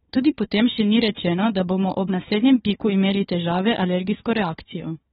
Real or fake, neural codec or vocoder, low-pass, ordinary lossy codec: fake; codec, 16 kHz, 4 kbps, FunCodec, trained on Chinese and English, 50 frames a second; 7.2 kHz; AAC, 16 kbps